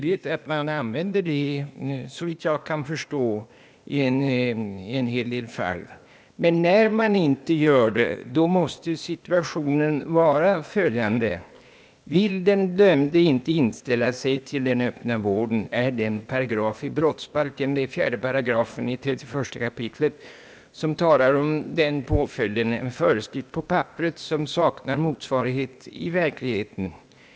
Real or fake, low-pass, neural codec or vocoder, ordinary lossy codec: fake; none; codec, 16 kHz, 0.8 kbps, ZipCodec; none